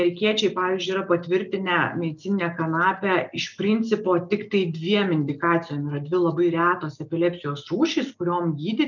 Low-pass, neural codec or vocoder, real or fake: 7.2 kHz; none; real